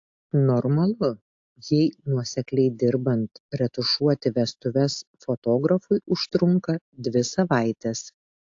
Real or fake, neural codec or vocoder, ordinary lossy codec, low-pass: real; none; AAC, 48 kbps; 7.2 kHz